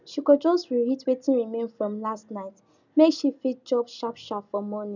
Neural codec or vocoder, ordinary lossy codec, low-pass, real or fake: none; none; 7.2 kHz; real